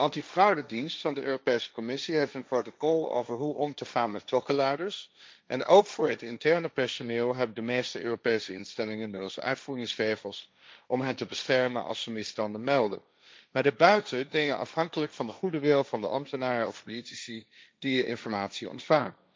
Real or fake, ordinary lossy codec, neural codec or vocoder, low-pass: fake; none; codec, 16 kHz, 1.1 kbps, Voila-Tokenizer; none